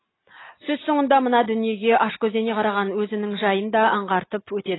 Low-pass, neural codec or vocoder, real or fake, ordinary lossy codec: 7.2 kHz; none; real; AAC, 16 kbps